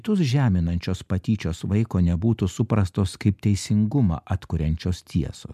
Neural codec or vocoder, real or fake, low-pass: none; real; 14.4 kHz